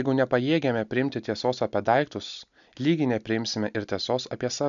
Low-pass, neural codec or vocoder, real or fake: 7.2 kHz; none; real